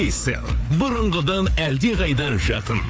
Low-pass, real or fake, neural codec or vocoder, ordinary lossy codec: none; fake; codec, 16 kHz, 4 kbps, FreqCodec, larger model; none